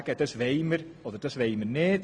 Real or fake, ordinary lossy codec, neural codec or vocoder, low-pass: real; none; none; 9.9 kHz